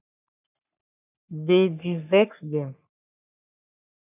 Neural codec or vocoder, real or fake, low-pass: codec, 44.1 kHz, 3.4 kbps, Pupu-Codec; fake; 3.6 kHz